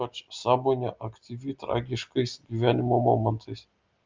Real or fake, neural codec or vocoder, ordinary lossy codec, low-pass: real; none; Opus, 24 kbps; 7.2 kHz